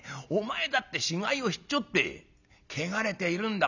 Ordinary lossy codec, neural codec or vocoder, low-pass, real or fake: none; none; 7.2 kHz; real